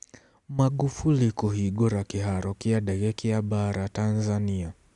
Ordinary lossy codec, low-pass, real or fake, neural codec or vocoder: none; 10.8 kHz; real; none